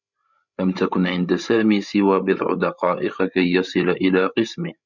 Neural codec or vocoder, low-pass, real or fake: codec, 16 kHz, 16 kbps, FreqCodec, larger model; 7.2 kHz; fake